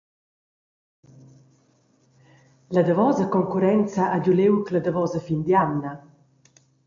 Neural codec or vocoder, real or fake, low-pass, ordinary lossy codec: none; real; 7.2 kHz; AAC, 64 kbps